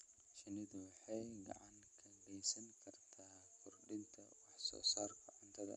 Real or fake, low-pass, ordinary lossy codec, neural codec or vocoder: real; 10.8 kHz; none; none